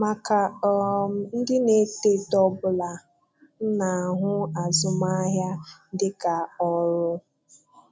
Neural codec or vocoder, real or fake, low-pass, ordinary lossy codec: none; real; none; none